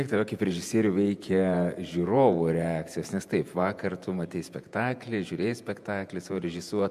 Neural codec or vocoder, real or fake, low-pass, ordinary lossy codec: vocoder, 48 kHz, 128 mel bands, Vocos; fake; 14.4 kHz; MP3, 96 kbps